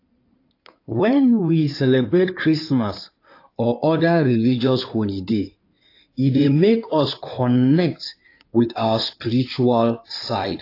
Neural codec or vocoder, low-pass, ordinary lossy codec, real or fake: codec, 16 kHz in and 24 kHz out, 2.2 kbps, FireRedTTS-2 codec; 5.4 kHz; AAC, 32 kbps; fake